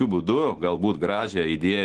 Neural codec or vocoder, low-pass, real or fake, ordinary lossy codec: autoencoder, 48 kHz, 128 numbers a frame, DAC-VAE, trained on Japanese speech; 10.8 kHz; fake; Opus, 16 kbps